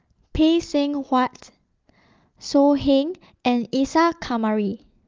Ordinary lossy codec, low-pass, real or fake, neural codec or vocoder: Opus, 32 kbps; 7.2 kHz; real; none